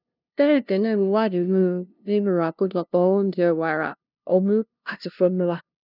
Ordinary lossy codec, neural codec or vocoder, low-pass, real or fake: none; codec, 16 kHz, 0.5 kbps, FunCodec, trained on LibriTTS, 25 frames a second; 5.4 kHz; fake